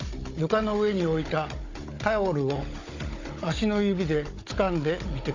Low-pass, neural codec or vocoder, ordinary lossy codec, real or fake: 7.2 kHz; codec, 16 kHz, 16 kbps, FunCodec, trained on Chinese and English, 50 frames a second; AAC, 48 kbps; fake